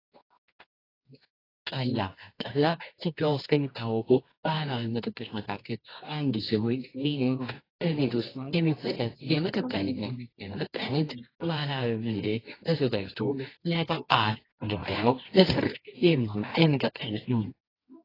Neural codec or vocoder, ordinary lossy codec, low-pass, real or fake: codec, 24 kHz, 0.9 kbps, WavTokenizer, medium music audio release; AAC, 24 kbps; 5.4 kHz; fake